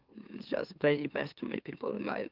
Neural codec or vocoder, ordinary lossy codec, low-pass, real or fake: autoencoder, 44.1 kHz, a latent of 192 numbers a frame, MeloTTS; Opus, 32 kbps; 5.4 kHz; fake